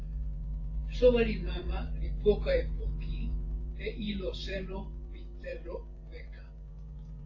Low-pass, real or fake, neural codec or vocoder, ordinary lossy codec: 7.2 kHz; fake; vocoder, 24 kHz, 100 mel bands, Vocos; AAC, 32 kbps